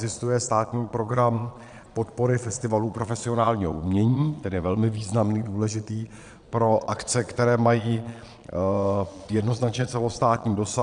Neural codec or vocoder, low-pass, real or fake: vocoder, 22.05 kHz, 80 mel bands, Vocos; 9.9 kHz; fake